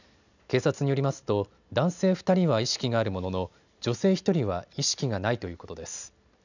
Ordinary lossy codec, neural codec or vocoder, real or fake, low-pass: none; none; real; 7.2 kHz